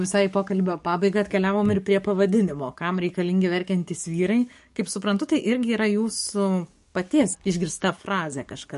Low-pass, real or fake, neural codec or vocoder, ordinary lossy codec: 14.4 kHz; fake; codec, 44.1 kHz, 7.8 kbps, DAC; MP3, 48 kbps